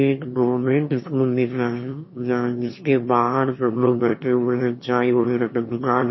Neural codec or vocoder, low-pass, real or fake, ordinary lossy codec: autoencoder, 22.05 kHz, a latent of 192 numbers a frame, VITS, trained on one speaker; 7.2 kHz; fake; MP3, 24 kbps